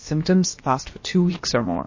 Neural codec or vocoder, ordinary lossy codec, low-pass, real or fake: codec, 16 kHz, about 1 kbps, DyCAST, with the encoder's durations; MP3, 32 kbps; 7.2 kHz; fake